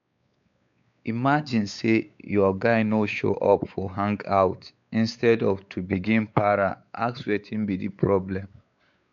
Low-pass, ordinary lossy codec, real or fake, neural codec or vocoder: 7.2 kHz; none; fake; codec, 16 kHz, 4 kbps, X-Codec, WavLM features, trained on Multilingual LibriSpeech